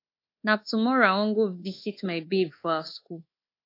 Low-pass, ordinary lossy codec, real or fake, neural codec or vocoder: 5.4 kHz; AAC, 32 kbps; fake; codec, 24 kHz, 1.2 kbps, DualCodec